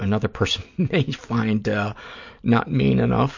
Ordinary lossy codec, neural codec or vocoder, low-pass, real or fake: MP3, 48 kbps; none; 7.2 kHz; real